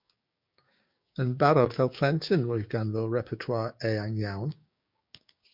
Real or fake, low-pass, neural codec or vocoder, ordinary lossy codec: fake; 5.4 kHz; codec, 16 kHz, 6 kbps, DAC; MP3, 48 kbps